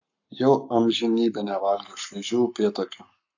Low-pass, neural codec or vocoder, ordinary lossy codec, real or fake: 7.2 kHz; codec, 44.1 kHz, 7.8 kbps, Pupu-Codec; AAC, 48 kbps; fake